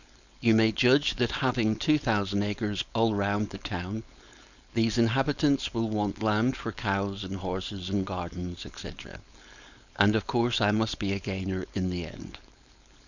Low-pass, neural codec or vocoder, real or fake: 7.2 kHz; codec, 16 kHz, 4.8 kbps, FACodec; fake